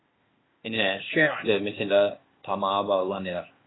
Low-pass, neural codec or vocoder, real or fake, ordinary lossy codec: 7.2 kHz; codec, 16 kHz, 0.8 kbps, ZipCodec; fake; AAC, 16 kbps